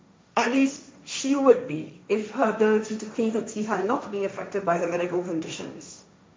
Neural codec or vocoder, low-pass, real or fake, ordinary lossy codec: codec, 16 kHz, 1.1 kbps, Voila-Tokenizer; none; fake; none